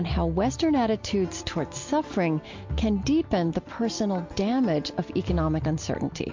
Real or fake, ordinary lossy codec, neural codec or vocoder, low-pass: real; MP3, 48 kbps; none; 7.2 kHz